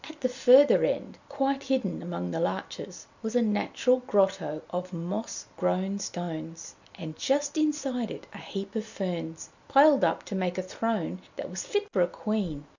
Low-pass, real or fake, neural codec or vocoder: 7.2 kHz; real; none